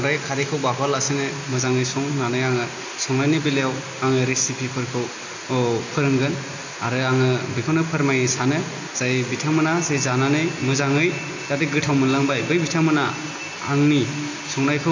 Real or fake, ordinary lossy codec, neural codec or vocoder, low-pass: real; AAC, 48 kbps; none; 7.2 kHz